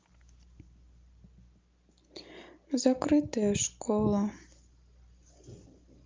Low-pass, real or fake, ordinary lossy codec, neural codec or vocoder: 7.2 kHz; real; Opus, 32 kbps; none